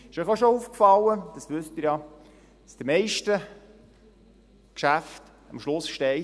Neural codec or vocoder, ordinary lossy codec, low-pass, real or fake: none; none; none; real